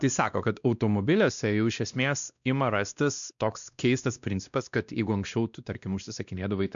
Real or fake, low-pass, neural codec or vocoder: fake; 7.2 kHz; codec, 16 kHz, 1 kbps, X-Codec, WavLM features, trained on Multilingual LibriSpeech